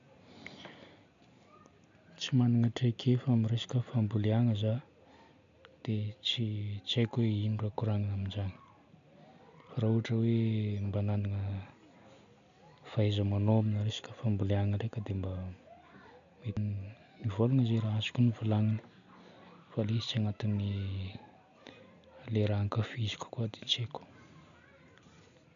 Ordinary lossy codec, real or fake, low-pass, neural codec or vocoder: AAC, 96 kbps; real; 7.2 kHz; none